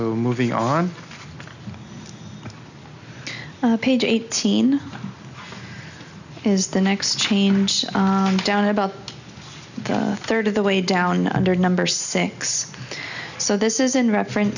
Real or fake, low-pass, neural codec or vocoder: real; 7.2 kHz; none